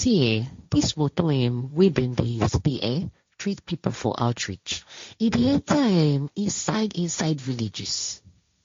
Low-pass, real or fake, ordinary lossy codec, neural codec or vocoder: 7.2 kHz; fake; MP3, 48 kbps; codec, 16 kHz, 1.1 kbps, Voila-Tokenizer